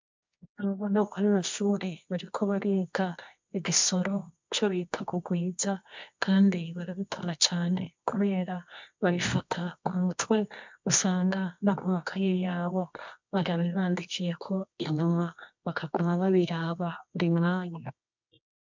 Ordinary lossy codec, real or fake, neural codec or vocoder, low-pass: MP3, 64 kbps; fake; codec, 24 kHz, 0.9 kbps, WavTokenizer, medium music audio release; 7.2 kHz